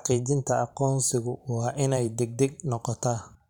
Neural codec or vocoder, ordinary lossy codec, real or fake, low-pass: vocoder, 44.1 kHz, 128 mel bands every 512 samples, BigVGAN v2; none; fake; 19.8 kHz